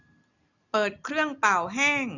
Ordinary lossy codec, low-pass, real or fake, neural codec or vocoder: none; 7.2 kHz; real; none